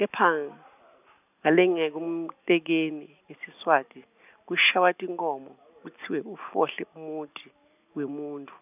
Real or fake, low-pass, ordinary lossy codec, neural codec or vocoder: real; 3.6 kHz; none; none